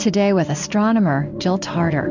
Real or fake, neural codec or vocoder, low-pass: fake; codec, 16 kHz in and 24 kHz out, 1 kbps, XY-Tokenizer; 7.2 kHz